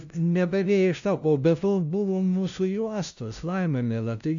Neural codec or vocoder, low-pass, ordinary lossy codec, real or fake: codec, 16 kHz, 0.5 kbps, FunCodec, trained on LibriTTS, 25 frames a second; 7.2 kHz; AAC, 64 kbps; fake